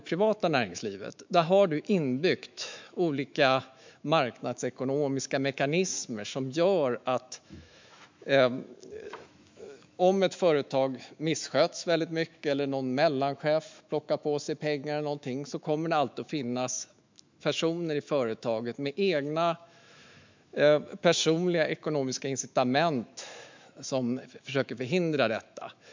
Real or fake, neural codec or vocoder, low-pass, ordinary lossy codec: fake; autoencoder, 48 kHz, 128 numbers a frame, DAC-VAE, trained on Japanese speech; 7.2 kHz; MP3, 64 kbps